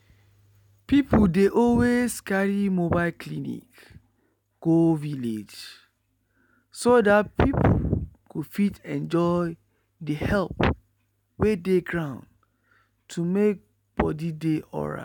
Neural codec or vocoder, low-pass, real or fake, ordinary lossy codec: none; 19.8 kHz; real; none